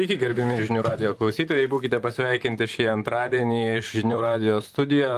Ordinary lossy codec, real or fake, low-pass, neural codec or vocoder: Opus, 32 kbps; fake; 14.4 kHz; vocoder, 44.1 kHz, 128 mel bands, Pupu-Vocoder